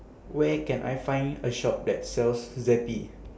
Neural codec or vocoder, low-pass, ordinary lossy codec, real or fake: none; none; none; real